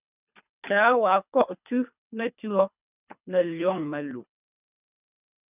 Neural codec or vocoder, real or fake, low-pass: codec, 24 kHz, 3 kbps, HILCodec; fake; 3.6 kHz